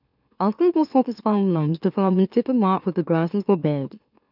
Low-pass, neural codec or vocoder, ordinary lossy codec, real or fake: 5.4 kHz; autoencoder, 44.1 kHz, a latent of 192 numbers a frame, MeloTTS; none; fake